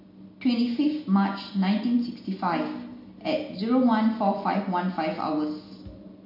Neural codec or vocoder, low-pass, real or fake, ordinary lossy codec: none; 5.4 kHz; real; MP3, 32 kbps